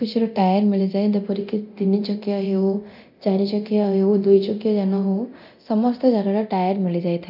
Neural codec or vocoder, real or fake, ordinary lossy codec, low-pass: codec, 24 kHz, 0.9 kbps, DualCodec; fake; none; 5.4 kHz